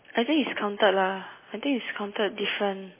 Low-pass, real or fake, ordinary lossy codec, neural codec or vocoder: 3.6 kHz; real; MP3, 16 kbps; none